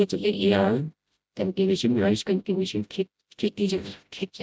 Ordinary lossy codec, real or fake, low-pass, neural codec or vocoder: none; fake; none; codec, 16 kHz, 0.5 kbps, FreqCodec, smaller model